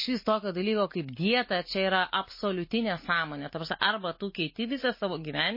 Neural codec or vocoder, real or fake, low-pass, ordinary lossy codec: none; real; 5.4 kHz; MP3, 24 kbps